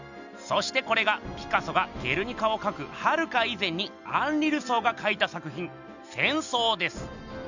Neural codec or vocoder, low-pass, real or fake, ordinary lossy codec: none; 7.2 kHz; real; none